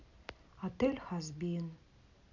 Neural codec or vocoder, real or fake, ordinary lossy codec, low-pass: none; real; none; 7.2 kHz